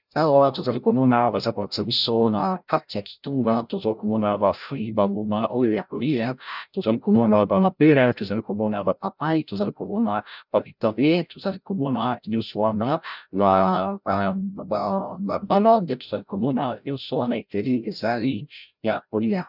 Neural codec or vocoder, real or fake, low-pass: codec, 16 kHz, 0.5 kbps, FreqCodec, larger model; fake; 5.4 kHz